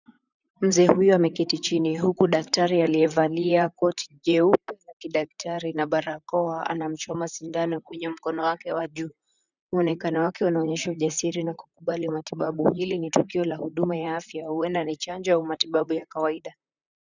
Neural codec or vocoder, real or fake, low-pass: vocoder, 44.1 kHz, 128 mel bands, Pupu-Vocoder; fake; 7.2 kHz